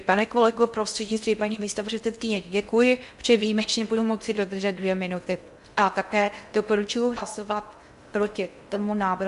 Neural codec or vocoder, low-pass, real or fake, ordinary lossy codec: codec, 16 kHz in and 24 kHz out, 0.6 kbps, FocalCodec, streaming, 2048 codes; 10.8 kHz; fake; MP3, 64 kbps